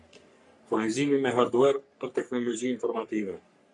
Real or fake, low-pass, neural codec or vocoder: fake; 10.8 kHz; codec, 44.1 kHz, 3.4 kbps, Pupu-Codec